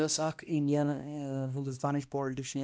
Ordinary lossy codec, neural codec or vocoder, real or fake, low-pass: none; codec, 16 kHz, 1 kbps, X-Codec, HuBERT features, trained on balanced general audio; fake; none